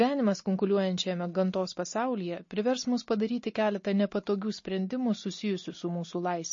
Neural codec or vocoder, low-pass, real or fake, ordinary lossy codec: none; 7.2 kHz; real; MP3, 32 kbps